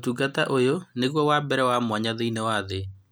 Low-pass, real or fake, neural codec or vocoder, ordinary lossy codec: none; real; none; none